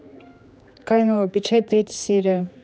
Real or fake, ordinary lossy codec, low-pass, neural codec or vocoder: fake; none; none; codec, 16 kHz, 2 kbps, X-Codec, HuBERT features, trained on general audio